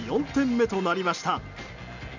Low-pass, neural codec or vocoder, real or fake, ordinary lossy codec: 7.2 kHz; none; real; none